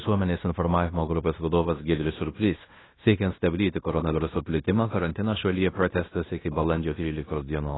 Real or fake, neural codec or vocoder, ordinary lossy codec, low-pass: fake; codec, 16 kHz in and 24 kHz out, 0.9 kbps, LongCat-Audio-Codec, fine tuned four codebook decoder; AAC, 16 kbps; 7.2 kHz